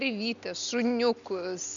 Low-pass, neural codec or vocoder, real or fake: 7.2 kHz; none; real